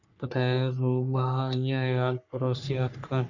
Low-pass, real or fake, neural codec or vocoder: 7.2 kHz; fake; codec, 44.1 kHz, 3.4 kbps, Pupu-Codec